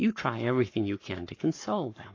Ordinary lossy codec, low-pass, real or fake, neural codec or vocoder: AAC, 32 kbps; 7.2 kHz; fake; codec, 44.1 kHz, 7.8 kbps, Pupu-Codec